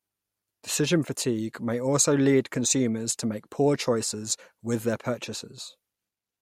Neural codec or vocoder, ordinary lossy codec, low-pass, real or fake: none; MP3, 64 kbps; 19.8 kHz; real